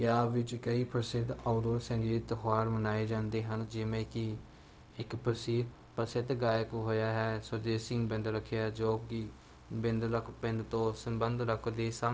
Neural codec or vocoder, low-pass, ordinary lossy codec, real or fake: codec, 16 kHz, 0.4 kbps, LongCat-Audio-Codec; none; none; fake